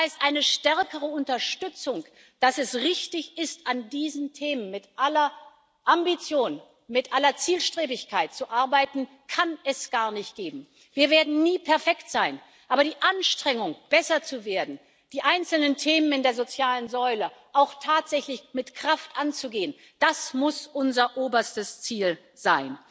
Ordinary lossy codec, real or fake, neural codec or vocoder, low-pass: none; real; none; none